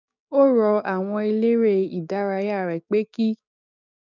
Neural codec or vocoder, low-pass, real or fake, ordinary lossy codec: codec, 16 kHz, 6 kbps, DAC; 7.2 kHz; fake; none